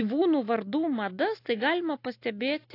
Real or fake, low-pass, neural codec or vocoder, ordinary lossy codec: real; 5.4 kHz; none; AAC, 32 kbps